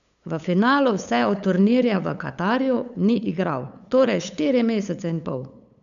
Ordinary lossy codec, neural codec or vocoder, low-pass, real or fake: none; codec, 16 kHz, 8 kbps, FunCodec, trained on LibriTTS, 25 frames a second; 7.2 kHz; fake